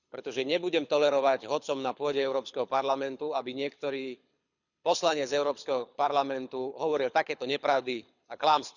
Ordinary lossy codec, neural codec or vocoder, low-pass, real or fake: none; codec, 24 kHz, 6 kbps, HILCodec; 7.2 kHz; fake